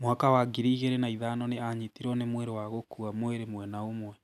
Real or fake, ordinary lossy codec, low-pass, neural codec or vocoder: real; none; 14.4 kHz; none